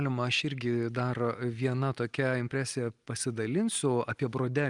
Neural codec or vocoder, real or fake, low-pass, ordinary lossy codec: none; real; 10.8 kHz; Opus, 32 kbps